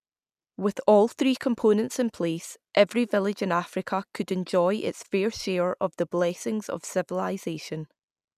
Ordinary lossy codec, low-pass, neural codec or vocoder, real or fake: none; 14.4 kHz; none; real